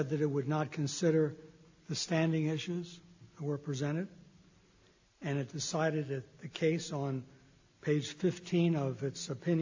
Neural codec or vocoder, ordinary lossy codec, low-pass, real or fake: none; AAC, 48 kbps; 7.2 kHz; real